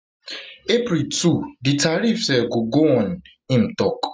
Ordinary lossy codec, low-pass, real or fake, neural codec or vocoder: none; none; real; none